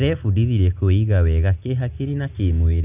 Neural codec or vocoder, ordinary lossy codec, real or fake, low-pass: none; Opus, 64 kbps; real; 3.6 kHz